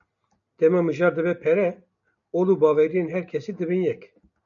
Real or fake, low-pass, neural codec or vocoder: real; 7.2 kHz; none